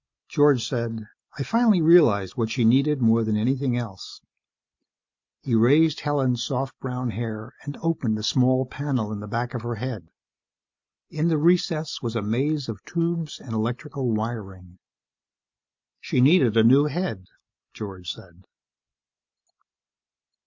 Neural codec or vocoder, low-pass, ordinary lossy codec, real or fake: none; 7.2 kHz; MP3, 48 kbps; real